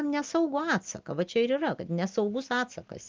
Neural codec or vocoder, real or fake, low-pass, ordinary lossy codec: none; real; 7.2 kHz; Opus, 32 kbps